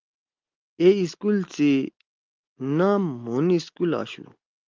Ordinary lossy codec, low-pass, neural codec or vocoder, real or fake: Opus, 32 kbps; 7.2 kHz; none; real